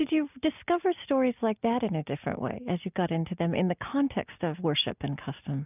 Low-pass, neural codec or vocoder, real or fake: 3.6 kHz; none; real